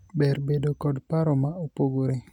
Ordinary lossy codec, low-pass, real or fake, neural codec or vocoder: none; 19.8 kHz; real; none